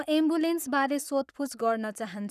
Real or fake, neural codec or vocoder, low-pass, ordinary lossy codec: fake; codec, 44.1 kHz, 7.8 kbps, Pupu-Codec; 14.4 kHz; none